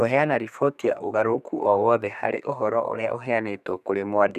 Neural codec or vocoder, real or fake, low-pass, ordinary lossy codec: codec, 32 kHz, 1.9 kbps, SNAC; fake; 14.4 kHz; none